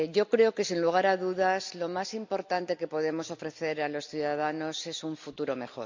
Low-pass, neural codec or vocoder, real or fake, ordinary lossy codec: 7.2 kHz; none; real; MP3, 64 kbps